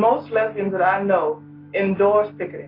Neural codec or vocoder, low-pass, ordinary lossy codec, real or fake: none; 5.4 kHz; AAC, 24 kbps; real